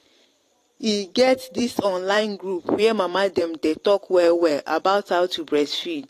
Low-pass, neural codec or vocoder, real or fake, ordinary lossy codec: 14.4 kHz; vocoder, 44.1 kHz, 128 mel bands every 512 samples, BigVGAN v2; fake; AAC, 48 kbps